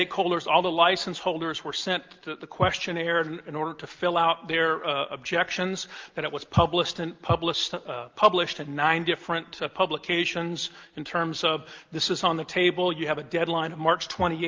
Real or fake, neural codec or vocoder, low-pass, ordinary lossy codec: real; none; 7.2 kHz; Opus, 32 kbps